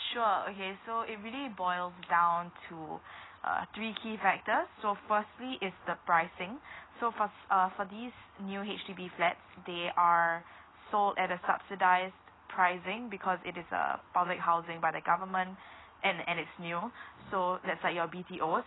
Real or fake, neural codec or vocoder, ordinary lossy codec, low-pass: real; none; AAC, 16 kbps; 7.2 kHz